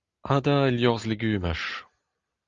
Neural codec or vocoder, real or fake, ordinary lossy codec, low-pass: none; real; Opus, 32 kbps; 7.2 kHz